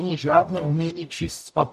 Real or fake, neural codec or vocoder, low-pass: fake; codec, 44.1 kHz, 0.9 kbps, DAC; 14.4 kHz